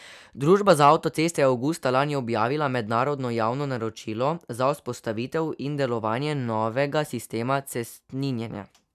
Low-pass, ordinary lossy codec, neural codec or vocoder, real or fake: 14.4 kHz; none; none; real